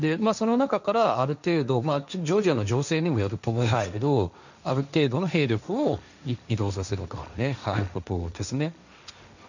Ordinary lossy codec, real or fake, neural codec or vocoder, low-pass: none; fake; codec, 16 kHz, 1.1 kbps, Voila-Tokenizer; 7.2 kHz